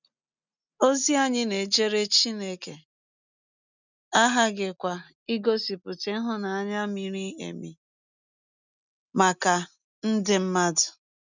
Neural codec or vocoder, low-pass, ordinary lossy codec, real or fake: none; 7.2 kHz; none; real